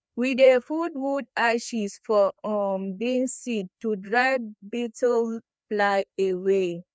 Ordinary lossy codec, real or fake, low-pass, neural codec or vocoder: none; fake; none; codec, 16 kHz, 2 kbps, FreqCodec, larger model